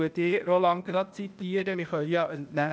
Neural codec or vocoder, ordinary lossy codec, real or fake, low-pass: codec, 16 kHz, 0.8 kbps, ZipCodec; none; fake; none